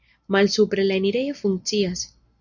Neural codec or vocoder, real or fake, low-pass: none; real; 7.2 kHz